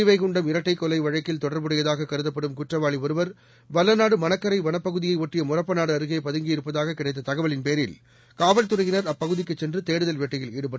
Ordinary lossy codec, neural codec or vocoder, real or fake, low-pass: none; none; real; none